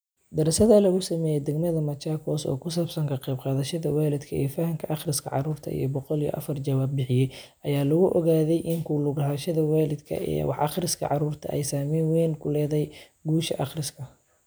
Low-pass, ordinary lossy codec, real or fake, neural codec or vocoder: none; none; real; none